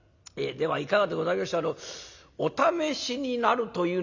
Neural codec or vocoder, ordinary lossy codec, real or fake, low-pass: none; none; real; 7.2 kHz